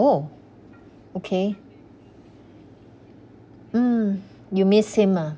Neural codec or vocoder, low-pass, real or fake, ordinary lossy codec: none; none; real; none